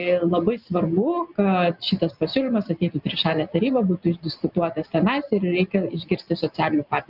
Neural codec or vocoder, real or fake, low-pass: none; real; 5.4 kHz